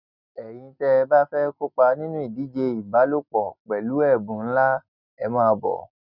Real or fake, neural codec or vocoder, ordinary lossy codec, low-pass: real; none; none; 5.4 kHz